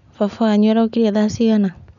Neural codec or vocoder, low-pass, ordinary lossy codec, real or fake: none; 7.2 kHz; none; real